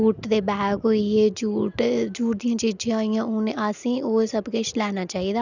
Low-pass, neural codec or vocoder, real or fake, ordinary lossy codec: 7.2 kHz; none; real; none